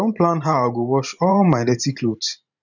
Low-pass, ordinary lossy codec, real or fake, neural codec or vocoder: 7.2 kHz; none; fake; vocoder, 44.1 kHz, 128 mel bands every 512 samples, BigVGAN v2